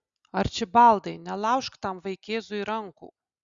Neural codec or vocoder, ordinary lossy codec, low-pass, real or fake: none; Opus, 64 kbps; 7.2 kHz; real